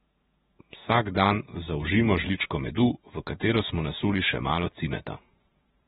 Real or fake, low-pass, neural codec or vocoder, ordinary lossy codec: fake; 19.8 kHz; vocoder, 48 kHz, 128 mel bands, Vocos; AAC, 16 kbps